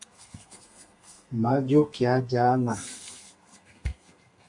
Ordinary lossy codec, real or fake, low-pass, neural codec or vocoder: MP3, 48 kbps; fake; 10.8 kHz; codec, 32 kHz, 1.9 kbps, SNAC